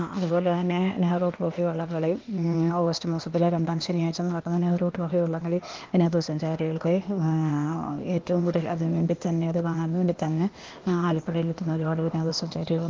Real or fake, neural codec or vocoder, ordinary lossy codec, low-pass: fake; codec, 16 kHz, 0.8 kbps, ZipCodec; Opus, 24 kbps; 7.2 kHz